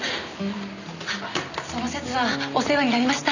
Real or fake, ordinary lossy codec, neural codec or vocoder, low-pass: real; none; none; 7.2 kHz